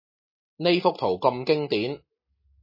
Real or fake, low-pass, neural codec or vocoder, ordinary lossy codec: real; 5.4 kHz; none; MP3, 24 kbps